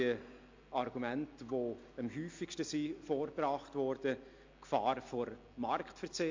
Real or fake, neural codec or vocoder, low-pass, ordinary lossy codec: real; none; 7.2 kHz; MP3, 48 kbps